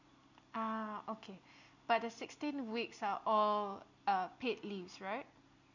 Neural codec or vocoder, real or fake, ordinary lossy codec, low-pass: none; real; none; 7.2 kHz